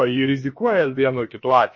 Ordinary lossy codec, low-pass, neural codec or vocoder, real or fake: MP3, 32 kbps; 7.2 kHz; codec, 16 kHz, about 1 kbps, DyCAST, with the encoder's durations; fake